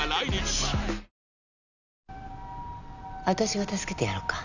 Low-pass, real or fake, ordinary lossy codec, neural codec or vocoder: 7.2 kHz; real; none; none